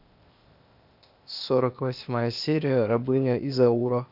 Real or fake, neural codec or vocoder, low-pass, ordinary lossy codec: fake; codec, 16 kHz, 0.8 kbps, ZipCodec; 5.4 kHz; none